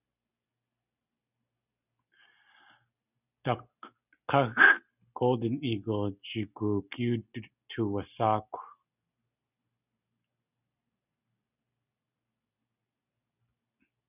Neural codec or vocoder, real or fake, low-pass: none; real; 3.6 kHz